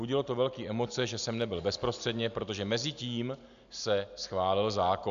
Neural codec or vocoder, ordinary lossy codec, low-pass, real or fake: none; AAC, 96 kbps; 7.2 kHz; real